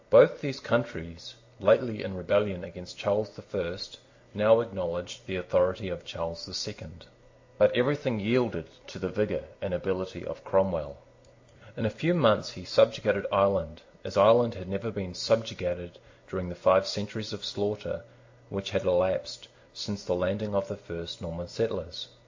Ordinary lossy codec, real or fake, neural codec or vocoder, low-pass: AAC, 48 kbps; real; none; 7.2 kHz